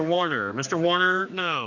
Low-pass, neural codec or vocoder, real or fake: 7.2 kHz; codec, 16 kHz, 2 kbps, X-Codec, HuBERT features, trained on general audio; fake